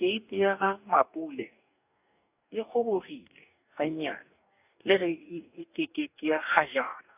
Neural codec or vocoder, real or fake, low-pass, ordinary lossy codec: codec, 44.1 kHz, 2.6 kbps, DAC; fake; 3.6 kHz; AAC, 32 kbps